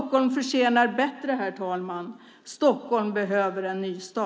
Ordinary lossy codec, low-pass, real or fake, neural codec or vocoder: none; none; real; none